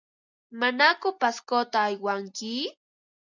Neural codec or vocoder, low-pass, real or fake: none; 7.2 kHz; real